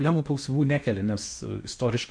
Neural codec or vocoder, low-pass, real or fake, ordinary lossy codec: codec, 16 kHz in and 24 kHz out, 0.8 kbps, FocalCodec, streaming, 65536 codes; 9.9 kHz; fake; MP3, 48 kbps